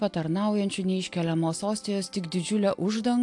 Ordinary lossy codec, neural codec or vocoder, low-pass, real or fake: AAC, 64 kbps; none; 10.8 kHz; real